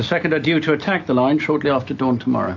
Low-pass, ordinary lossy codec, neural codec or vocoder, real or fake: 7.2 kHz; AAC, 48 kbps; none; real